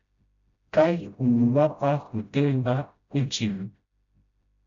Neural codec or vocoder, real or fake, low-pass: codec, 16 kHz, 0.5 kbps, FreqCodec, smaller model; fake; 7.2 kHz